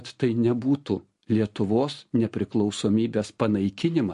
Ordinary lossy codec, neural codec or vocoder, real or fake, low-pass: MP3, 48 kbps; none; real; 10.8 kHz